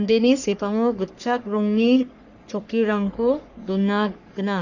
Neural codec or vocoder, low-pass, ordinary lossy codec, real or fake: codec, 44.1 kHz, 3.4 kbps, Pupu-Codec; 7.2 kHz; none; fake